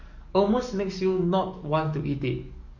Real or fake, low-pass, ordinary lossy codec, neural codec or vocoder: fake; 7.2 kHz; none; codec, 44.1 kHz, 7.8 kbps, DAC